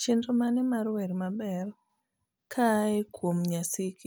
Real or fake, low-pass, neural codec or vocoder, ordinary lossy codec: real; none; none; none